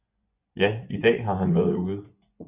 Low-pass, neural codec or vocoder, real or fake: 3.6 kHz; vocoder, 24 kHz, 100 mel bands, Vocos; fake